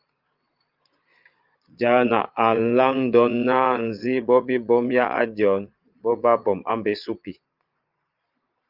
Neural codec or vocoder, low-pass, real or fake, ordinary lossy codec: vocoder, 22.05 kHz, 80 mel bands, Vocos; 5.4 kHz; fake; Opus, 24 kbps